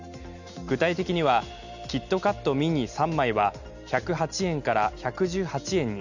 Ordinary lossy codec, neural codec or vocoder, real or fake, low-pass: none; none; real; 7.2 kHz